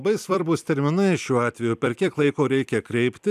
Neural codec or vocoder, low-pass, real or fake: vocoder, 44.1 kHz, 128 mel bands every 256 samples, BigVGAN v2; 14.4 kHz; fake